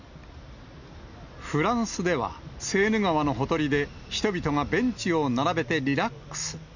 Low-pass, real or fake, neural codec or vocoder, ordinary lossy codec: 7.2 kHz; real; none; none